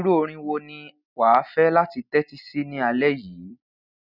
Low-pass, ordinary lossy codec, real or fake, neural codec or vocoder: 5.4 kHz; none; real; none